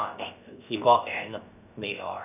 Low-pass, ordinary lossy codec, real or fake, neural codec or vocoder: 3.6 kHz; none; fake; codec, 16 kHz, about 1 kbps, DyCAST, with the encoder's durations